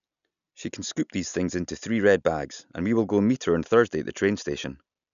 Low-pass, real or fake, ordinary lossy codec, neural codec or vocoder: 7.2 kHz; real; none; none